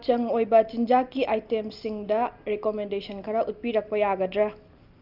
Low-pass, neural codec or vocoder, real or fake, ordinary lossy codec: 5.4 kHz; none; real; Opus, 24 kbps